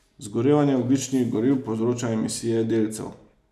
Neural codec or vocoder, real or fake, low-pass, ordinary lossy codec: none; real; 14.4 kHz; none